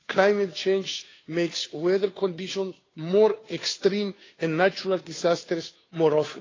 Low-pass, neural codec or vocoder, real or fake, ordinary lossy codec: 7.2 kHz; codec, 16 kHz, 2 kbps, FunCodec, trained on Chinese and English, 25 frames a second; fake; AAC, 32 kbps